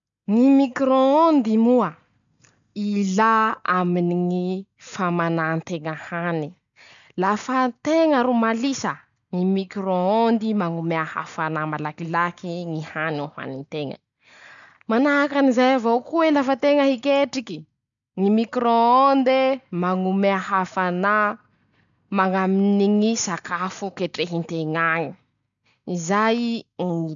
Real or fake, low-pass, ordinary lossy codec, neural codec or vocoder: real; 7.2 kHz; none; none